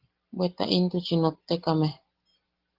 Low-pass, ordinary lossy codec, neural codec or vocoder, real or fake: 5.4 kHz; Opus, 16 kbps; none; real